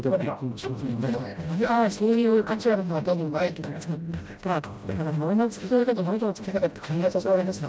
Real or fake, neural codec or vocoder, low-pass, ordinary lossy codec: fake; codec, 16 kHz, 0.5 kbps, FreqCodec, smaller model; none; none